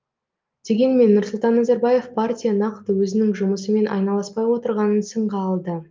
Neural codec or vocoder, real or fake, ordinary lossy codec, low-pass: none; real; Opus, 24 kbps; 7.2 kHz